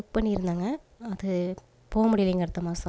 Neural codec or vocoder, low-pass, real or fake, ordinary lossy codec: none; none; real; none